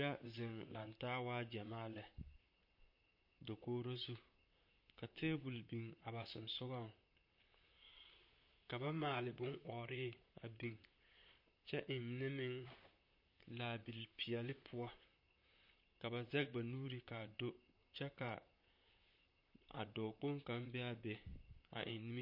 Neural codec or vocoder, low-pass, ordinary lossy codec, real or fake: vocoder, 44.1 kHz, 128 mel bands, Pupu-Vocoder; 5.4 kHz; MP3, 32 kbps; fake